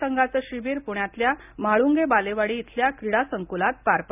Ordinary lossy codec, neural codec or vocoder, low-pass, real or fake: none; none; 3.6 kHz; real